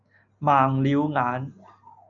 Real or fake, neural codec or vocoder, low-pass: real; none; 7.2 kHz